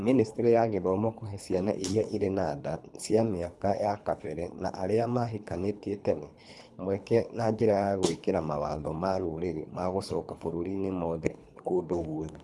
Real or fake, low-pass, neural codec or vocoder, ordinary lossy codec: fake; none; codec, 24 kHz, 3 kbps, HILCodec; none